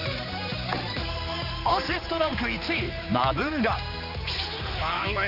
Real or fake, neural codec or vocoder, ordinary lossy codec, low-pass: fake; codec, 16 kHz, 4 kbps, X-Codec, HuBERT features, trained on general audio; none; 5.4 kHz